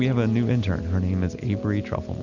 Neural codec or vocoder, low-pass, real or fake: none; 7.2 kHz; real